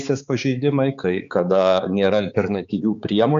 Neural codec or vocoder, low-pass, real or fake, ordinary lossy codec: codec, 16 kHz, 4 kbps, X-Codec, HuBERT features, trained on balanced general audio; 7.2 kHz; fake; MP3, 64 kbps